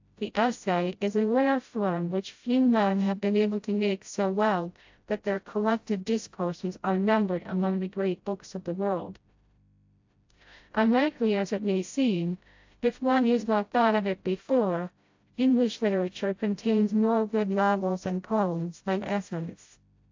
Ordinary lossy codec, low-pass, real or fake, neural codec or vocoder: AAC, 48 kbps; 7.2 kHz; fake; codec, 16 kHz, 0.5 kbps, FreqCodec, smaller model